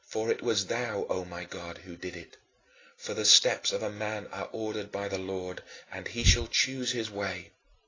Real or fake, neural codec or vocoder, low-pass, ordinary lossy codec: real; none; 7.2 kHz; AAC, 32 kbps